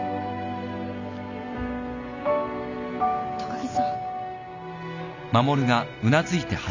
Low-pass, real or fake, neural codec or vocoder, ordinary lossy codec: 7.2 kHz; real; none; none